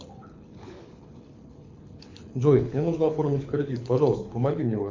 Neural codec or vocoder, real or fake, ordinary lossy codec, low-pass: codec, 24 kHz, 6 kbps, HILCodec; fake; MP3, 48 kbps; 7.2 kHz